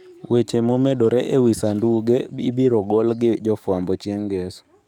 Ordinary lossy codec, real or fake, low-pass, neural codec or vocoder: none; fake; 19.8 kHz; codec, 44.1 kHz, 7.8 kbps, DAC